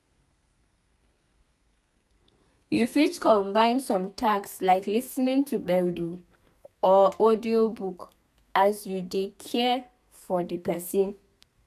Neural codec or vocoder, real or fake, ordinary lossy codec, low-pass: codec, 32 kHz, 1.9 kbps, SNAC; fake; none; 14.4 kHz